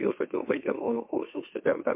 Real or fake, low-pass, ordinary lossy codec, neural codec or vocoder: fake; 3.6 kHz; MP3, 24 kbps; autoencoder, 44.1 kHz, a latent of 192 numbers a frame, MeloTTS